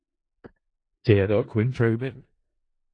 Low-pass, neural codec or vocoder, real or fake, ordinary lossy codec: 9.9 kHz; codec, 16 kHz in and 24 kHz out, 0.4 kbps, LongCat-Audio-Codec, four codebook decoder; fake; AAC, 48 kbps